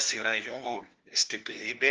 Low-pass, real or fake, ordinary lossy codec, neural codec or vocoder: 7.2 kHz; fake; Opus, 16 kbps; codec, 16 kHz, 1 kbps, FunCodec, trained on LibriTTS, 50 frames a second